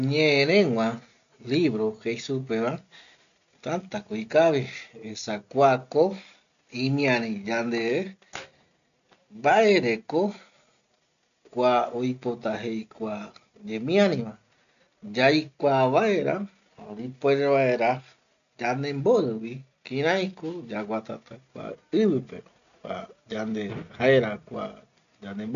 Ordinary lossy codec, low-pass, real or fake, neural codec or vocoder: none; 7.2 kHz; real; none